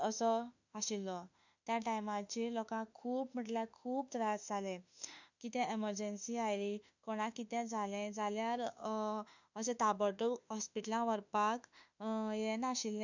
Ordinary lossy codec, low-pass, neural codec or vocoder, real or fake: none; 7.2 kHz; autoencoder, 48 kHz, 32 numbers a frame, DAC-VAE, trained on Japanese speech; fake